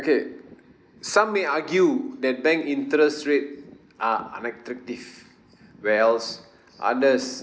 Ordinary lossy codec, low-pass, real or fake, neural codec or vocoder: none; none; real; none